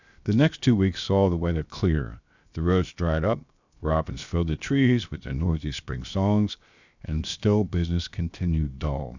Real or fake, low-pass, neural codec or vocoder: fake; 7.2 kHz; codec, 16 kHz, 0.7 kbps, FocalCodec